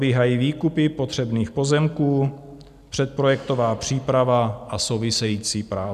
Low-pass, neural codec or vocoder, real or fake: 14.4 kHz; none; real